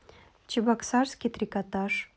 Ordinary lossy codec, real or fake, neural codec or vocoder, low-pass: none; real; none; none